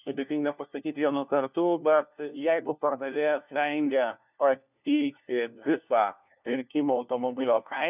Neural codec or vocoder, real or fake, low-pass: codec, 16 kHz, 1 kbps, FunCodec, trained on LibriTTS, 50 frames a second; fake; 3.6 kHz